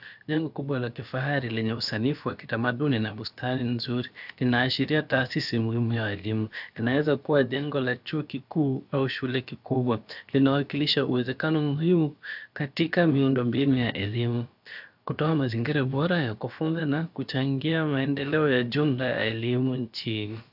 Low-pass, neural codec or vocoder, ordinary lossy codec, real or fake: 5.4 kHz; codec, 16 kHz, about 1 kbps, DyCAST, with the encoder's durations; AAC, 48 kbps; fake